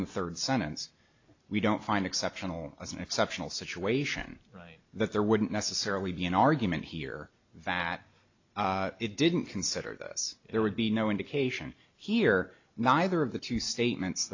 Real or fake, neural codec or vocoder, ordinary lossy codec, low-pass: real; none; AAC, 48 kbps; 7.2 kHz